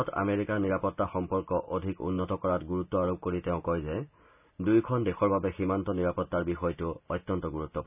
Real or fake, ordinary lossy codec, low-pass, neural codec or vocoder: real; none; 3.6 kHz; none